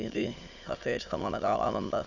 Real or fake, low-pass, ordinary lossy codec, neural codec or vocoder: fake; 7.2 kHz; none; autoencoder, 22.05 kHz, a latent of 192 numbers a frame, VITS, trained on many speakers